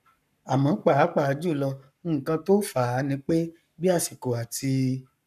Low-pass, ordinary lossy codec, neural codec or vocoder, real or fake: 14.4 kHz; none; codec, 44.1 kHz, 7.8 kbps, Pupu-Codec; fake